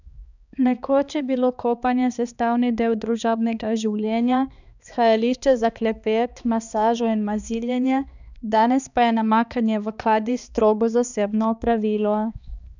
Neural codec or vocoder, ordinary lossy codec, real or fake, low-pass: codec, 16 kHz, 2 kbps, X-Codec, HuBERT features, trained on balanced general audio; none; fake; 7.2 kHz